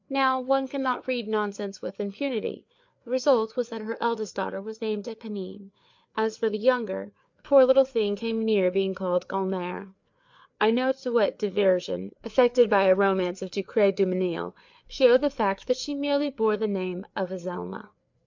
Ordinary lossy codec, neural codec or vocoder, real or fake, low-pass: MP3, 64 kbps; codec, 16 kHz, 4 kbps, FreqCodec, larger model; fake; 7.2 kHz